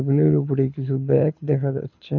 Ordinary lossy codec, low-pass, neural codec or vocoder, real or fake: none; 7.2 kHz; codec, 24 kHz, 6 kbps, HILCodec; fake